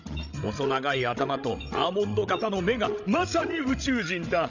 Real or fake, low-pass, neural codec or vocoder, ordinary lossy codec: fake; 7.2 kHz; codec, 16 kHz, 16 kbps, FreqCodec, larger model; none